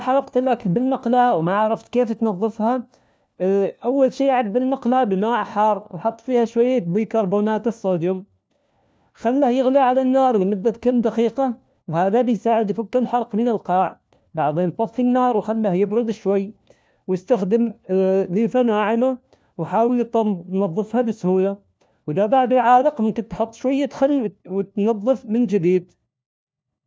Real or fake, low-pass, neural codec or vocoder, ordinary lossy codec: fake; none; codec, 16 kHz, 1 kbps, FunCodec, trained on LibriTTS, 50 frames a second; none